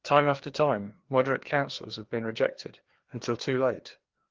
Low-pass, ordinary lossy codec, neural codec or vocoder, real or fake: 7.2 kHz; Opus, 16 kbps; codec, 16 kHz, 2 kbps, FreqCodec, larger model; fake